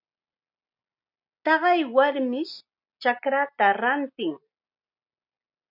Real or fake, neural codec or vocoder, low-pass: real; none; 5.4 kHz